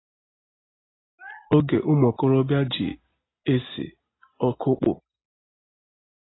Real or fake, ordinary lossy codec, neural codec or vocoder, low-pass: real; AAC, 16 kbps; none; 7.2 kHz